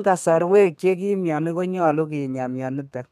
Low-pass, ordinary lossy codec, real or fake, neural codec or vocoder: 14.4 kHz; none; fake; codec, 32 kHz, 1.9 kbps, SNAC